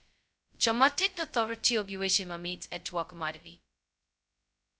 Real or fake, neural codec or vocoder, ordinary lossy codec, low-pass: fake; codec, 16 kHz, 0.2 kbps, FocalCodec; none; none